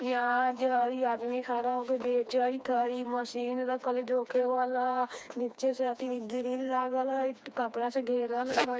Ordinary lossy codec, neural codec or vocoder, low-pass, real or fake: none; codec, 16 kHz, 2 kbps, FreqCodec, smaller model; none; fake